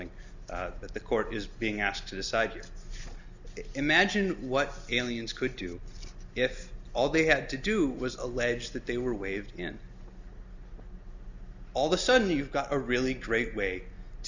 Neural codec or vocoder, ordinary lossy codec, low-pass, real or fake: none; Opus, 64 kbps; 7.2 kHz; real